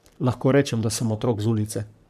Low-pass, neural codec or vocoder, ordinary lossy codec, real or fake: 14.4 kHz; codec, 44.1 kHz, 3.4 kbps, Pupu-Codec; none; fake